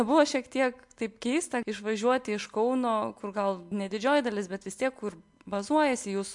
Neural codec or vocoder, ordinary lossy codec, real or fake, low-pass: none; MP3, 64 kbps; real; 10.8 kHz